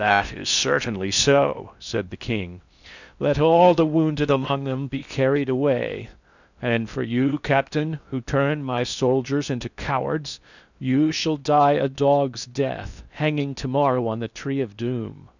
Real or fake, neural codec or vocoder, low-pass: fake; codec, 16 kHz in and 24 kHz out, 0.6 kbps, FocalCodec, streaming, 4096 codes; 7.2 kHz